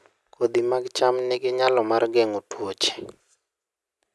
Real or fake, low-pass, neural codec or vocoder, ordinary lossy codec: real; none; none; none